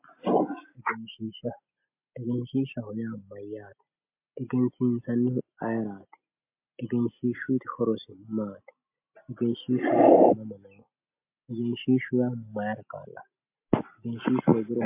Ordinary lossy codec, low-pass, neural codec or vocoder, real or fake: MP3, 32 kbps; 3.6 kHz; none; real